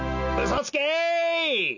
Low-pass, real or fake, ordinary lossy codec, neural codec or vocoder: 7.2 kHz; real; none; none